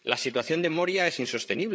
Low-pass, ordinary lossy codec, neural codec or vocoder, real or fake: none; none; codec, 16 kHz, 8 kbps, FreqCodec, larger model; fake